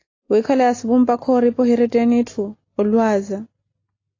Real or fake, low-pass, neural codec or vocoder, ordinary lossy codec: real; 7.2 kHz; none; AAC, 32 kbps